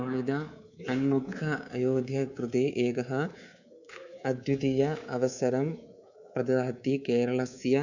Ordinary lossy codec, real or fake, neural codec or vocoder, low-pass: none; fake; codec, 24 kHz, 3.1 kbps, DualCodec; 7.2 kHz